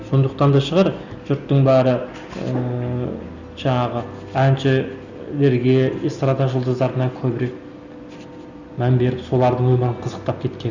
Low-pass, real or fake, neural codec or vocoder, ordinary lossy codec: 7.2 kHz; real; none; none